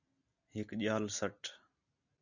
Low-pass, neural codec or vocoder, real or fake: 7.2 kHz; none; real